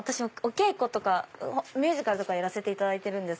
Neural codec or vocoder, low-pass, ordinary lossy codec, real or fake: none; none; none; real